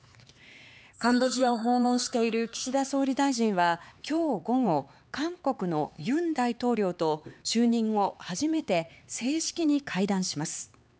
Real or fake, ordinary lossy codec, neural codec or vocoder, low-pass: fake; none; codec, 16 kHz, 4 kbps, X-Codec, HuBERT features, trained on LibriSpeech; none